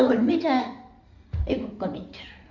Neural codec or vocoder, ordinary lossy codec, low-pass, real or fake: codec, 16 kHz in and 24 kHz out, 2.2 kbps, FireRedTTS-2 codec; none; 7.2 kHz; fake